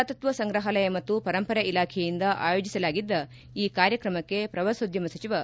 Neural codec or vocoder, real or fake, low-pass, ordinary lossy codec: none; real; none; none